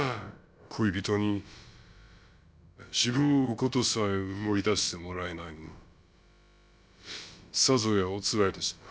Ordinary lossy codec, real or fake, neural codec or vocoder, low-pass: none; fake; codec, 16 kHz, about 1 kbps, DyCAST, with the encoder's durations; none